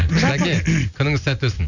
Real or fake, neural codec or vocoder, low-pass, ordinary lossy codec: real; none; 7.2 kHz; none